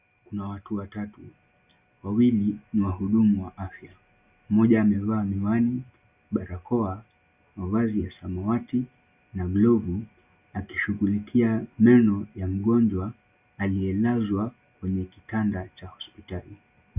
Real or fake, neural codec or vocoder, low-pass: real; none; 3.6 kHz